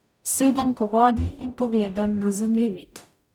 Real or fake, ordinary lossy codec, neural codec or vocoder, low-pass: fake; none; codec, 44.1 kHz, 0.9 kbps, DAC; 19.8 kHz